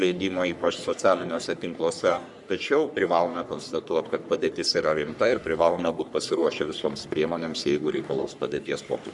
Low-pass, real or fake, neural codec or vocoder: 10.8 kHz; fake; codec, 44.1 kHz, 3.4 kbps, Pupu-Codec